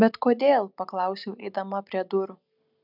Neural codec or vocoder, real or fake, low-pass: none; real; 5.4 kHz